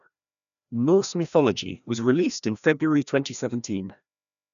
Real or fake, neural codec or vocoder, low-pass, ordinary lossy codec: fake; codec, 16 kHz, 1 kbps, FreqCodec, larger model; 7.2 kHz; none